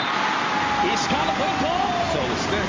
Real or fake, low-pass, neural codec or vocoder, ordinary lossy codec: real; 7.2 kHz; none; Opus, 32 kbps